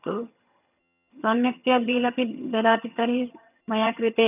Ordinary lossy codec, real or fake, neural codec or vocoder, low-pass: none; fake; vocoder, 22.05 kHz, 80 mel bands, HiFi-GAN; 3.6 kHz